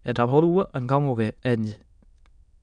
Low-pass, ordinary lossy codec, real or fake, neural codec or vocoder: 9.9 kHz; MP3, 96 kbps; fake; autoencoder, 22.05 kHz, a latent of 192 numbers a frame, VITS, trained on many speakers